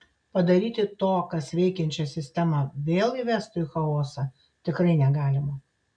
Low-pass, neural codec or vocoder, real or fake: 9.9 kHz; none; real